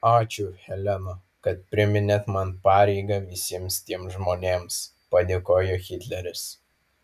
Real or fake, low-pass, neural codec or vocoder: real; 14.4 kHz; none